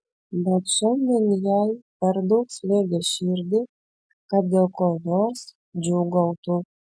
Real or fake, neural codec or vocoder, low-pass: real; none; 9.9 kHz